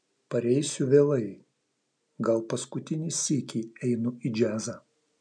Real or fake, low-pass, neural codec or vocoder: real; 9.9 kHz; none